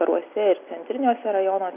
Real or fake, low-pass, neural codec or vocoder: real; 3.6 kHz; none